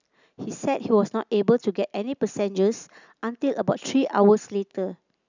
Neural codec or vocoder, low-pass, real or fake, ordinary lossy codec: none; 7.2 kHz; real; none